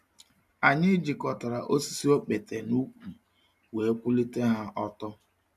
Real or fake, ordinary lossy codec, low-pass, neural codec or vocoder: real; none; 14.4 kHz; none